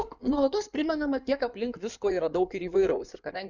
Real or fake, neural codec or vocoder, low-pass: fake; codec, 16 kHz in and 24 kHz out, 2.2 kbps, FireRedTTS-2 codec; 7.2 kHz